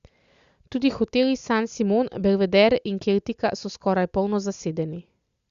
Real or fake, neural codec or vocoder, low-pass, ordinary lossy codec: real; none; 7.2 kHz; Opus, 64 kbps